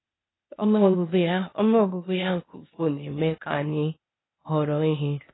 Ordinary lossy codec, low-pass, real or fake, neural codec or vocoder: AAC, 16 kbps; 7.2 kHz; fake; codec, 16 kHz, 0.8 kbps, ZipCodec